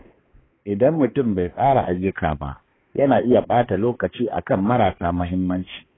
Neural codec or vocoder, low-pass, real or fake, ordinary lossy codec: codec, 16 kHz, 2 kbps, X-Codec, HuBERT features, trained on balanced general audio; 7.2 kHz; fake; AAC, 16 kbps